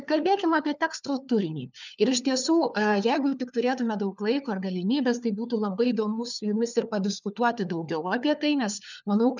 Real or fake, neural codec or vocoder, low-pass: fake; codec, 16 kHz, 4 kbps, FunCodec, trained on LibriTTS, 50 frames a second; 7.2 kHz